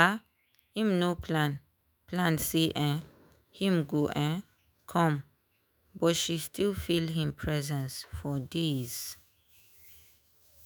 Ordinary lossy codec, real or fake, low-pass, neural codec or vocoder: none; fake; none; autoencoder, 48 kHz, 128 numbers a frame, DAC-VAE, trained on Japanese speech